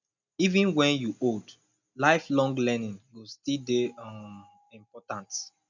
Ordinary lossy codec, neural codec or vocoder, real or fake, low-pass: none; none; real; 7.2 kHz